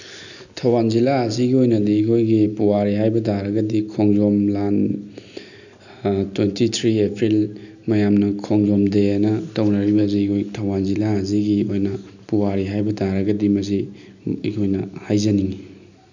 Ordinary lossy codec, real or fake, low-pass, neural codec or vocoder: none; real; 7.2 kHz; none